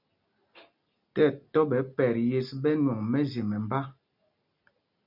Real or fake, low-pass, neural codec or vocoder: real; 5.4 kHz; none